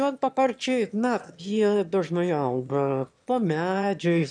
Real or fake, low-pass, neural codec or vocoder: fake; 9.9 kHz; autoencoder, 22.05 kHz, a latent of 192 numbers a frame, VITS, trained on one speaker